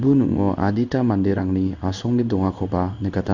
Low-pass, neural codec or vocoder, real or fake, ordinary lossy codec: 7.2 kHz; codec, 16 kHz in and 24 kHz out, 1 kbps, XY-Tokenizer; fake; none